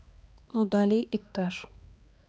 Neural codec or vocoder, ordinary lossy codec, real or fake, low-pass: codec, 16 kHz, 2 kbps, X-Codec, HuBERT features, trained on balanced general audio; none; fake; none